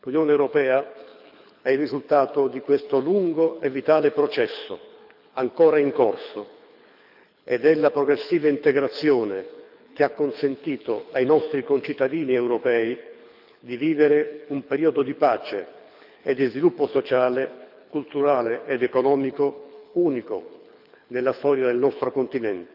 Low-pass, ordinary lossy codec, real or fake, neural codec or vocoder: 5.4 kHz; none; fake; codec, 24 kHz, 6 kbps, HILCodec